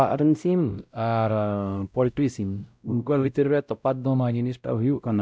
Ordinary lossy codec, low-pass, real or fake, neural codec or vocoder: none; none; fake; codec, 16 kHz, 0.5 kbps, X-Codec, WavLM features, trained on Multilingual LibriSpeech